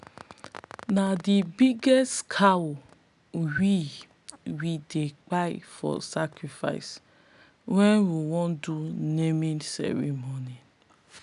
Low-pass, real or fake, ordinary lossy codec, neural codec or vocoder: 10.8 kHz; real; none; none